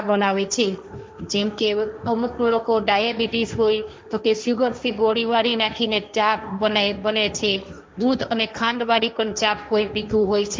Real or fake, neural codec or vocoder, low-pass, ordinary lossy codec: fake; codec, 16 kHz, 1.1 kbps, Voila-Tokenizer; none; none